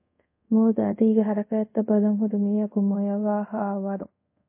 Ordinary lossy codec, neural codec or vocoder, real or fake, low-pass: MP3, 24 kbps; codec, 24 kHz, 0.5 kbps, DualCodec; fake; 3.6 kHz